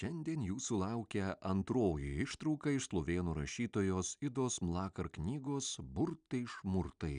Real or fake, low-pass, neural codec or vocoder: real; 9.9 kHz; none